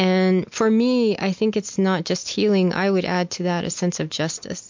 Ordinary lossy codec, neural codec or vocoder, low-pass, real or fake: MP3, 48 kbps; none; 7.2 kHz; real